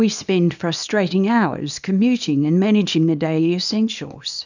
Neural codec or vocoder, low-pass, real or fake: codec, 24 kHz, 0.9 kbps, WavTokenizer, small release; 7.2 kHz; fake